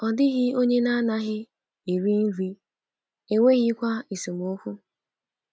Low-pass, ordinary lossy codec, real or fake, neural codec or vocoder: none; none; real; none